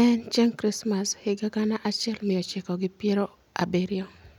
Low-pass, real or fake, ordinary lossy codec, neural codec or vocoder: 19.8 kHz; real; none; none